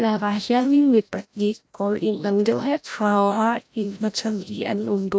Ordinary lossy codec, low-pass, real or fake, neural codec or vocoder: none; none; fake; codec, 16 kHz, 0.5 kbps, FreqCodec, larger model